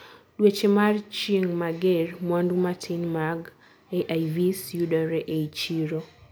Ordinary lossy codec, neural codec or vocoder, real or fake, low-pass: none; none; real; none